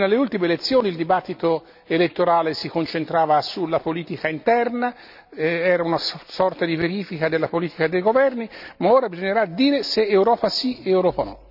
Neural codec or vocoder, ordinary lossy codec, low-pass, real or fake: none; none; 5.4 kHz; real